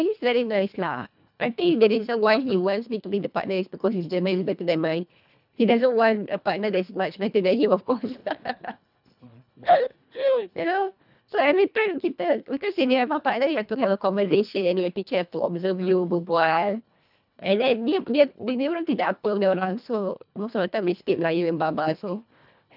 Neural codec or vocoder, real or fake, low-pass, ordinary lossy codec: codec, 24 kHz, 1.5 kbps, HILCodec; fake; 5.4 kHz; none